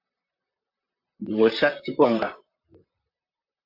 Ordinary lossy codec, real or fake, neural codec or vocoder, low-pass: AAC, 32 kbps; fake; vocoder, 44.1 kHz, 128 mel bands, Pupu-Vocoder; 5.4 kHz